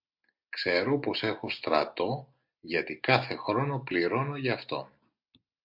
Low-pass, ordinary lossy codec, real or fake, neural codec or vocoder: 5.4 kHz; MP3, 48 kbps; real; none